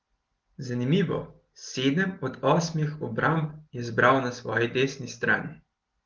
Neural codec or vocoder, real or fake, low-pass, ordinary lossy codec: none; real; 7.2 kHz; Opus, 16 kbps